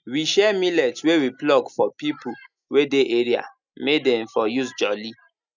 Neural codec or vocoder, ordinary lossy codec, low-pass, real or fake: none; none; 7.2 kHz; real